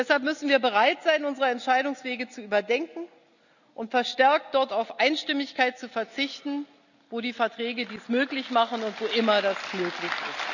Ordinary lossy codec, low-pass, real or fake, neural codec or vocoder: none; 7.2 kHz; real; none